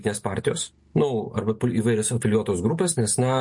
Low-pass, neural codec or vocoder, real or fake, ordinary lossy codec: 10.8 kHz; none; real; MP3, 48 kbps